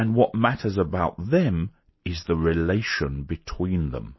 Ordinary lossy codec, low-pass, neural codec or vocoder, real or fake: MP3, 24 kbps; 7.2 kHz; none; real